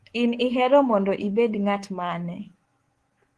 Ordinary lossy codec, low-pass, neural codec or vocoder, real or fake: Opus, 16 kbps; 10.8 kHz; codec, 24 kHz, 3.1 kbps, DualCodec; fake